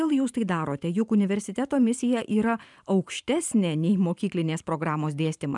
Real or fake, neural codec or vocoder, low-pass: fake; vocoder, 44.1 kHz, 128 mel bands every 512 samples, BigVGAN v2; 10.8 kHz